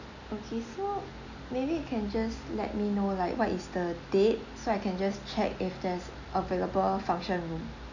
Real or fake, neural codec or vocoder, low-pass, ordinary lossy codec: real; none; 7.2 kHz; none